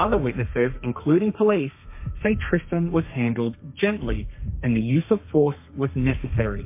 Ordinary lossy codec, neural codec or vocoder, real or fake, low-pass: MP3, 24 kbps; codec, 32 kHz, 1.9 kbps, SNAC; fake; 3.6 kHz